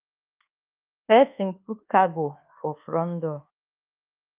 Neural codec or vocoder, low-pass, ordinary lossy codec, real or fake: codec, 24 kHz, 1.2 kbps, DualCodec; 3.6 kHz; Opus, 32 kbps; fake